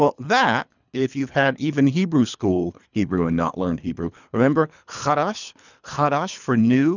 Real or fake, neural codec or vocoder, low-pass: fake; codec, 24 kHz, 3 kbps, HILCodec; 7.2 kHz